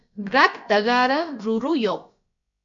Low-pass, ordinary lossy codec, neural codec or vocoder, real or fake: 7.2 kHz; MP3, 64 kbps; codec, 16 kHz, about 1 kbps, DyCAST, with the encoder's durations; fake